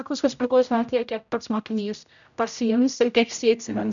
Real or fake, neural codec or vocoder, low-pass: fake; codec, 16 kHz, 0.5 kbps, X-Codec, HuBERT features, trained on general audio; 7.2 kHz